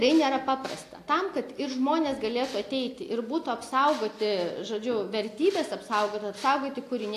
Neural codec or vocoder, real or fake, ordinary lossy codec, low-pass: none; real; AAC, 64 kbps; 14.4 kHz